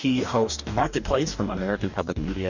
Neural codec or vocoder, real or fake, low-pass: codec, 44.1 kHz, 2.6 kbps, DAC; fake; 7.2 kHz